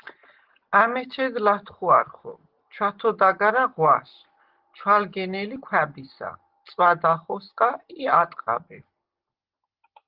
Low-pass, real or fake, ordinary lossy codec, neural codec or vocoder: 5.4 kHz; real; Opus, 16 kbps; none